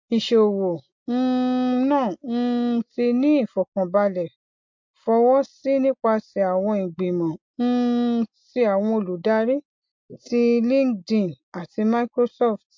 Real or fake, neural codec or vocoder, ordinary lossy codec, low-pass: real; none; MP3, 48 kbps; 7.2 kHz